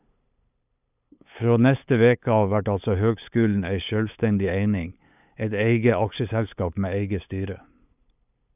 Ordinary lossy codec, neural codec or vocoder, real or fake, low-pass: none; codec, 16 kHz, 8 kbps, FunCodec, trained on LibriTTS, 25 frames a second; fake; 3.6 kHz